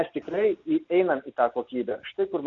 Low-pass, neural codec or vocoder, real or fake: 7.2 kHz; none; real